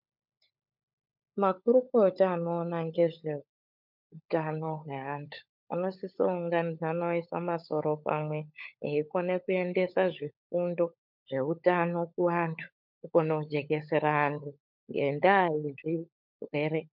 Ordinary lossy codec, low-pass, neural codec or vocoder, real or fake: MP3, 48 kbps; 5.4 kHz; codec, 16 kHz, 16 kbps, FunCodec, trained on LibriTTS, 50 frames a second; fake